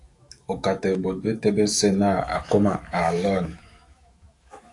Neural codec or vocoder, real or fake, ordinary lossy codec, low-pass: autoencoder, 48 kHz, 128 numbers a frame, DAC-VAE, trained on Japanese speech; fake; AAC, 48 kbps; 10.8 kHz